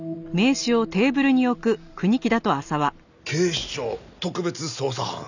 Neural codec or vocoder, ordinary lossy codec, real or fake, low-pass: none; none; real; 7.2 kHz